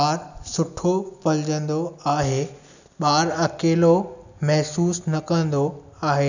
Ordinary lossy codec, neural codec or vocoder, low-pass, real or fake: none; none; 7.2 kHz; real